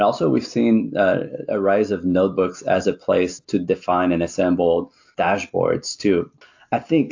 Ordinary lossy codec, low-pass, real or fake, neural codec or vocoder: AAC, 48 kbps; 7.2 kHz; real; none